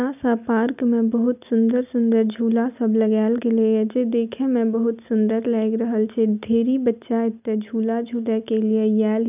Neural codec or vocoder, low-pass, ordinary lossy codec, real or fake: none; 3.6 kHz; none; real